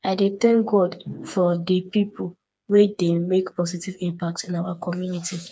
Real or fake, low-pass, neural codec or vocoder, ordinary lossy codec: fake; none; codec, 16 kHz, 4 kbps, FreqCodec, smaller model; none